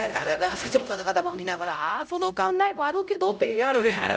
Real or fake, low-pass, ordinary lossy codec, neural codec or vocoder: fake; none; none; codec, 16 kHz, 0.5 kbps, X-Codec, HuBERT features, trained on LibriSpeech